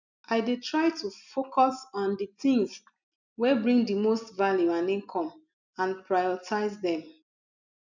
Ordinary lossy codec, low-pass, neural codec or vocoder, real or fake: none; 7.2 kHz; none; real